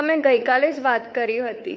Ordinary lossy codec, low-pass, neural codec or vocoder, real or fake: none; none; codec, 16 kHz, 4 kbps, X-Codec, WavLM features, trained on Multilingual LibriSpeech; fake